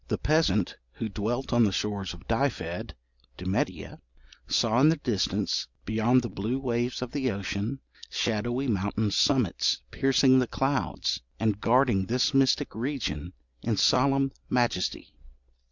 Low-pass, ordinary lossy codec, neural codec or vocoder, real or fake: 7.2 kHz; Opus, 64 kbps; none; real